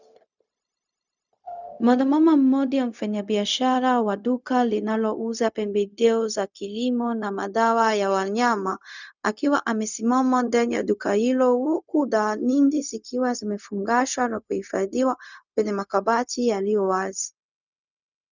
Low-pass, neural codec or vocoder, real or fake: 7.2 kHz; codec, 16 kHz, 0.4 kbps, LongCat-Audio-Codec; fake